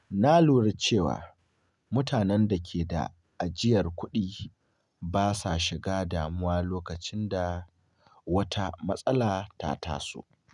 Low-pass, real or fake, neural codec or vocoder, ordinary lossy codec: 10.8 kHz; real; none; none